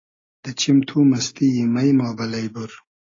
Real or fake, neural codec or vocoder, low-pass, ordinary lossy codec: real; none; 7.2 kHz; AAC, 32 kbps